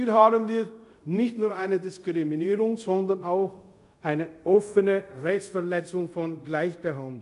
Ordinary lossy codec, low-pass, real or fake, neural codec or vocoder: MP3, 64 kbps; 10.8 kHz; fake; codec, 24 kHz, 0.5 kbps, DualCodec